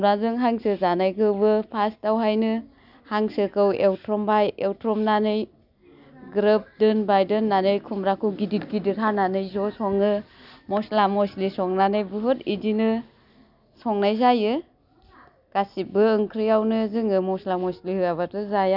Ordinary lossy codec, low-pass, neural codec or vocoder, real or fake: none; 5.4 kHz; none; real